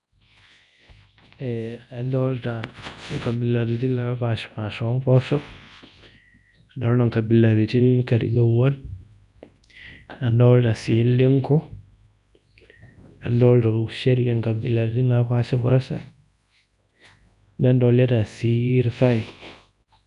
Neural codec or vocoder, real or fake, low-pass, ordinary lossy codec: codec, 24 kHz, 0.9 kbps, WavTokenizer, large speech release; fake; 10.8 kHz; none